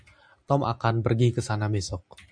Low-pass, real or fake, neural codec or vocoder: 9.9 kHz; real; none